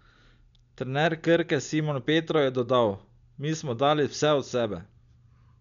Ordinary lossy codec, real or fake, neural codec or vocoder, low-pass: none; real; none; 7.2 kHz